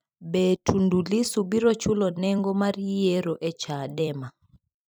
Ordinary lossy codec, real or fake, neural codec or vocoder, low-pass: none; fake; vocoder, 44.1 kHz, 128 mel bands every 256 samples, BigVGAN v2; none